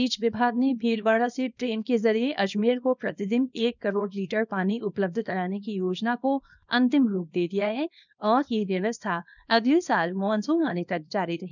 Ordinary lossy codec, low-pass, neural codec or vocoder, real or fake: none; 7.2 kHz; codec, 24 kHz, 0.9 kbps, WavTokenizer, small release; fake